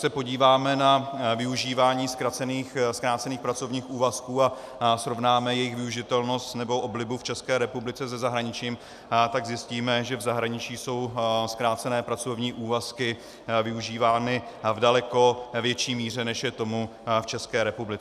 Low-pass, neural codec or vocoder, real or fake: 14.4 kHz; none; real